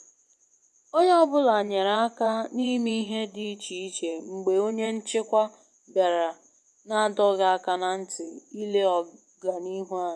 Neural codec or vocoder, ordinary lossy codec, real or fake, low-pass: vocoder, 24 kHz, 100 mel bands, Vocos; none; fake; none